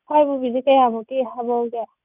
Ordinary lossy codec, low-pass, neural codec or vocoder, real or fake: none; 3.6 kHz; none; real